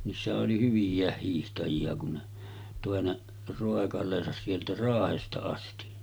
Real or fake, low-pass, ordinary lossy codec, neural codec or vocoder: real; none; none; none